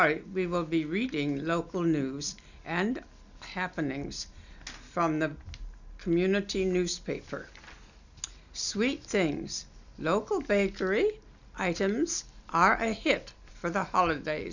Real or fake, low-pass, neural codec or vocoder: real; 7.2 kHz; none